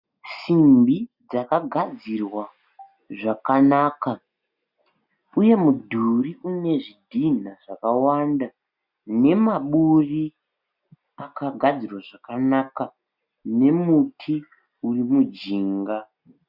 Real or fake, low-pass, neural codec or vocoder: real; 5.4 kHz; none